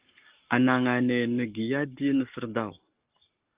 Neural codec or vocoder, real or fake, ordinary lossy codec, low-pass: codec, 44.1 kHz, 7.8 kbps, Pupu-Codec; fake; Opus, 32 kbps; 3.6 kHz